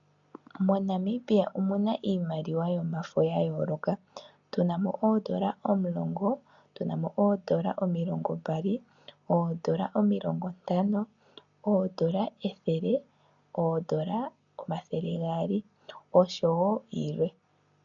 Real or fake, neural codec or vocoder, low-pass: real; none; 7.2 kHz